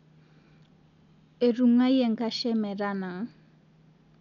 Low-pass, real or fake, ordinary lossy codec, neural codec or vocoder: 7.2 kHz; real; none; none